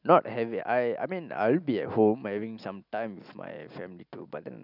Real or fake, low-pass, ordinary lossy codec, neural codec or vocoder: real; 5.4 kHz; none; none